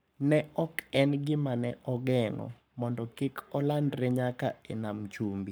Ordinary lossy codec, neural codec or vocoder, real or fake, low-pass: none; codec, 44.1 kHz, 7.8 kbps, Pupu-Codec; fake; none